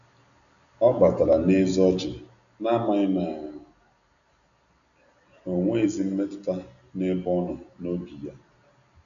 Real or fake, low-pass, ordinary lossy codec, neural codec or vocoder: real; 7.2 kHz; none; none